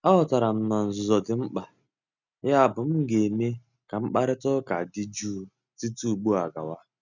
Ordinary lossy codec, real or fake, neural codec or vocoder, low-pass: none; real; none; 7.2 kHz